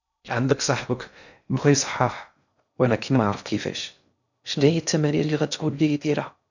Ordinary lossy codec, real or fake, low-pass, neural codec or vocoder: none; fake; 7.2 kHz; codec, 16 kHz in and 24 kHz out, 0.6 kbps, FocalCodec, streaming, 2048 codes